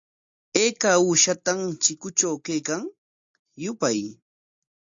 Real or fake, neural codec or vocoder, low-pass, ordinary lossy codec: real; none; 7.2 kHz; AAC, 64 kbps